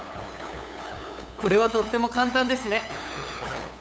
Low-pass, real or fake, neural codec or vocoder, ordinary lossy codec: none; fake; codec, 16 kHz, 8 kbps, FunCodec, trained on LibriTTS, 25 frames a second; none